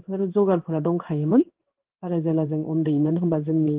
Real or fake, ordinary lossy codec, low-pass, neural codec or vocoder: fake; Opus, 16 kbps; 3.6 kHz; codec, 16 kHz in and 24 kHz out, 1 kbps, XY-Tokenizer